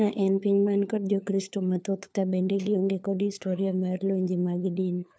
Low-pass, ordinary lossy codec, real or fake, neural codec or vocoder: none; none; fake; codec, 16 kHz, 4 kbps, FunCodec, trained on LibriTTS, 50 frames a second